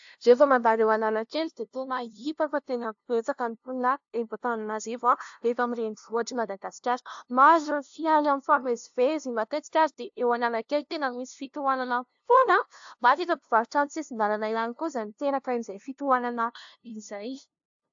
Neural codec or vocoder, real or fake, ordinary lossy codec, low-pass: codec, 16 kHz, 0.5 kbps, FunCodec, trained on LibriTTS, 25 frames a second; fake; AAC, 64 kbps; 7.2 kHz